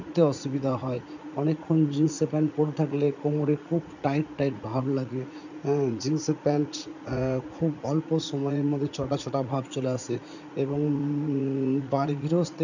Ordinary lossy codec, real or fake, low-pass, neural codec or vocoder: none; fake; 7.2 kHz; vocoder, 44.1 kHz, 80 mel bands, Vocos